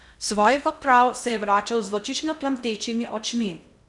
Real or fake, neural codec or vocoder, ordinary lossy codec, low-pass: fake; codec, 16 kHz in and 24 kHz out, 0.6 kbps, FocalCodec, streaming, 2048 codes; none; 10.8 kHz